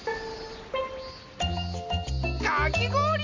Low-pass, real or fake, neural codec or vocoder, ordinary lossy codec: 7.2 kHz; real; none; AAC, 48 kbps